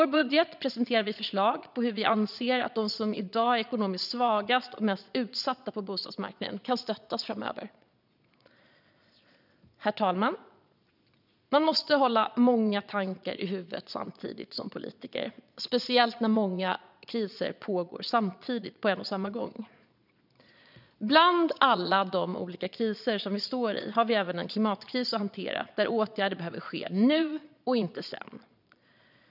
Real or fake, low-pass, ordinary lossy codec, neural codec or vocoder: fake; 5.4 kHz; none; vocoder, 22.05 kHz, 80 mel bands, Vocos